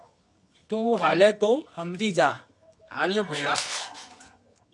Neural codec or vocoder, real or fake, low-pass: codec, 24 kHz, 0.9 kbps, WavTokenizer, medium music audio release; fake; 10.8 kHz